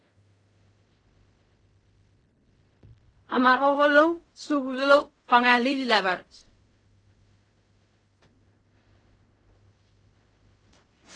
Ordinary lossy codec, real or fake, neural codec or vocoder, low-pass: AAC, 32 kbps; fake; codec, 16 kHz in and 24 kHz out, 0.4 kbps, LongCat-Audio-Codec, fine tuned four codebook decoder; 9.9 kHz